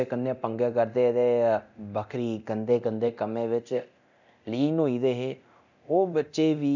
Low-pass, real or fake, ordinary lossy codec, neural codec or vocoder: 7.2 kHz; fake; none; codec, 24 kHz, 0.9 kbps, DualCodec